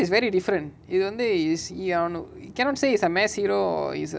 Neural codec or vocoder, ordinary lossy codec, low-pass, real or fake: none; none; none; real